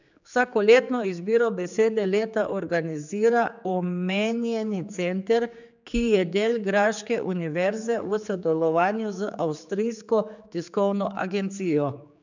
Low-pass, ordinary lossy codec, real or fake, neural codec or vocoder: 7.2 kHz; none; fake; codec, 16 kHz, 4 kbps, X-Codec, HuBERT features, trained on general audio